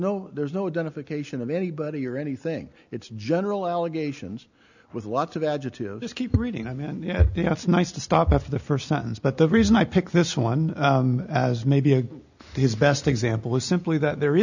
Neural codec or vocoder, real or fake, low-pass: none; real; 7.2 kHz